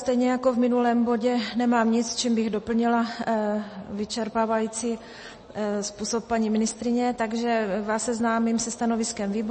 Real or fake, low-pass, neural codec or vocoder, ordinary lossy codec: real; 9.9 kHz; none; MP3, 32 kbps